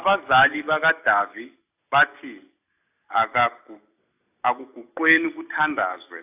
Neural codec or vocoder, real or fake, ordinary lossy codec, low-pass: none; real; AAC, 32 kbps; 3.6 kHz